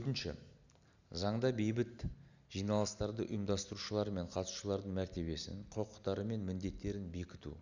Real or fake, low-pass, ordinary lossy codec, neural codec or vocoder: real; 7.2 kHz; none; none